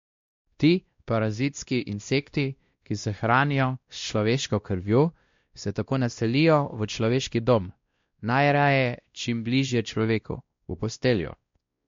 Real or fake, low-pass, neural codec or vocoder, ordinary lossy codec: fake; 7.2 kHz; codec, 16 kHz, 1 kbps, X-Codec, WavLM features, trained on Multilingual LibriSpeech; MP3, 48 kbps